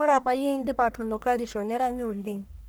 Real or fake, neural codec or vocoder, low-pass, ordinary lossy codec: fake; codec, 44.1 kHz, 1.7 kbps, Pupu-Codec; none; none